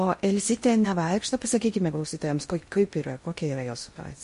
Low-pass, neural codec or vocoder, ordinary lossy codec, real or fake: 10.8 kHz; codec, 16 kHz in and 24 kHz out, 0.6 kbps, FocalCodec, streaming, 2048 codes; MP3, 48 kbps; fake